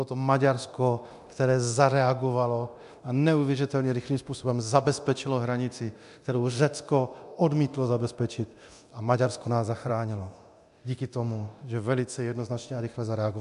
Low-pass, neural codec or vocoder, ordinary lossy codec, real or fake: 10.8 kHz; codec, 24 kHz, 0.9 kbps, DualCodec; AAC, 96 kbps; fake